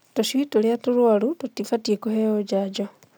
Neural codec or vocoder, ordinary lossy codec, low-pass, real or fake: none; none; none; real